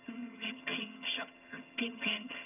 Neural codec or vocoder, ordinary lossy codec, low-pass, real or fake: vocoder, 22.05 kHz, 80 mel bands, HiFi-GAN; none; 3.6 kHz; fake